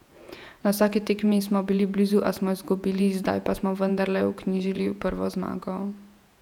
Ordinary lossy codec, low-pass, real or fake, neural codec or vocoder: none; 19.8 kHz; fake; vocoder, 48 kHz, 128 mel bands, Vocos